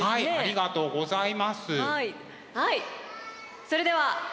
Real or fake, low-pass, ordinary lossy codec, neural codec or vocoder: real; none; none; none